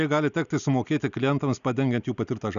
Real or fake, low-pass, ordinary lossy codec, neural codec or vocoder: real; 7.2 kHz; MP3, 96 kbps; none